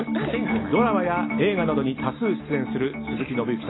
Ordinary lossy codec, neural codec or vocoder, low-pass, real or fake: AAC, 16 kbps; none; 7.2 kHz; real